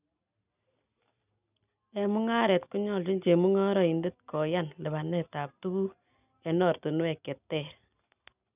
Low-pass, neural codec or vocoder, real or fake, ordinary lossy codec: 3.6 kHz; none; real; none